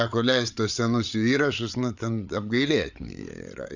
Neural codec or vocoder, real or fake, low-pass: codec, 16 kHz, 8 kbps, FreqCodec, larger model; fake; 7.2 kHz